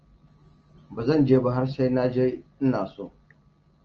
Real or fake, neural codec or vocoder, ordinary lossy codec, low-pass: real; none; Opus, 24 kbps; 7.2 kHz